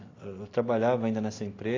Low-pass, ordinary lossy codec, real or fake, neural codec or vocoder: 7.2 kHz; AAC, 32 kbps; real; none